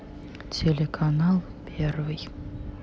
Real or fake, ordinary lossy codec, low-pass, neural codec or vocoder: real; none; none; none